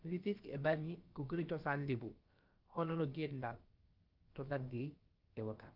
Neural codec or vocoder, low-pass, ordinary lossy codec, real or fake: codec, 16 kHz, about 1 kbps, DyCAST, with the encoder's durations; 5.4 kHz; Opus, 32 kbps; fake